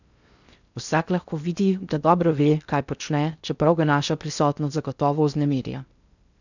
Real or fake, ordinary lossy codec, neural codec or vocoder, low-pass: fake; none; codec, 16 kHz in and 24 kHz out, 0.6 kbps, FocalCodec, streaming, 4096 codes; 7.2 kHz